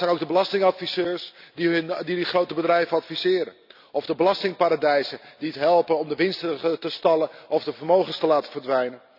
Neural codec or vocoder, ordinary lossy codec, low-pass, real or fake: none; none; 5.4 kHz; real